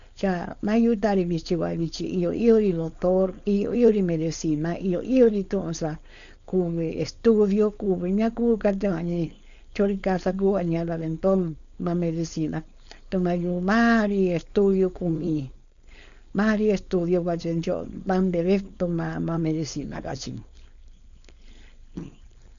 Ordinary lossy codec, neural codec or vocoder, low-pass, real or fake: none; codec, 16 kHz, 4.8 kbps, FACodec; 7.2 kHz; fake